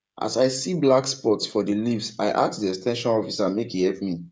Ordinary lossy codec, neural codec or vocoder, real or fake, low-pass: none; codec, 16 kHz, 16 kbps, FreqCodec, smaller model; fake; none